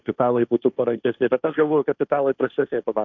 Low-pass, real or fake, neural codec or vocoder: 7.2 kHz; fake; codec, 16 kHz, 1.1 kbps, Voila-Tokenizer